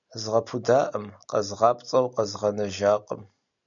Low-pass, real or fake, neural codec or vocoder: 7.2 kHz; real; none